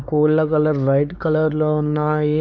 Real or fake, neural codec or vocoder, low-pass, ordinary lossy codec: fake; codec, 16 kHz, 4 kbps, X-Codec, WavLM features, trained on Multilingual LibriSpeech; none; none